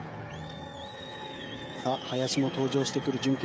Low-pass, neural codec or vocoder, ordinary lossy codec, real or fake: none; codec, 16 kHz, 16 kbps, FreqCodec, smaller model; none; fake